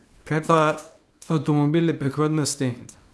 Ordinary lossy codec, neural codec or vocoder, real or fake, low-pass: none; codec, 24 kHz, 0.9 kbps, WavTokenizer, small release; fake; none